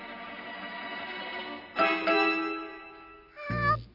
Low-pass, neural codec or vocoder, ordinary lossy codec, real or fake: 5.4 kHz; none; none; real